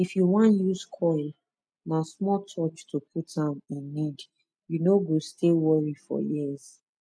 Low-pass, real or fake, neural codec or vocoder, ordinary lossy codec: none; real; none; none